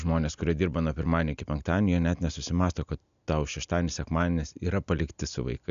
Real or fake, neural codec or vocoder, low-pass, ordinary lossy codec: real; none; 7.2 kHz; AAC, 96 kbps